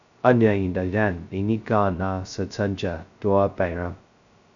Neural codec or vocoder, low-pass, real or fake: codec, 16 kHz, 0.2 kbps, FocalCodec; 7.2 kHz; fake